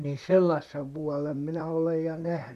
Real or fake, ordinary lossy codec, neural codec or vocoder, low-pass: fake; none; vocoder, 44.1 kHz, 128 mel bands, Pupu-Vocoder; 14.4 kHz